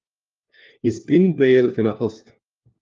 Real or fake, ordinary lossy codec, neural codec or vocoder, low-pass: fake; Opus, 32 kbps; codec, 16 kHz, 1 kbps, FunCodec, trained on LibriTTS, 50 frames a second; 7.2 kHz